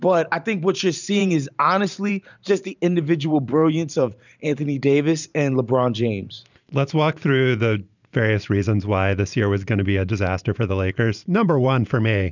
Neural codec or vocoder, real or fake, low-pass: vocoder, 44.1 kHz, 128 mel bands every 512 samples, BigVGAN v2; fake; 7.2 kHz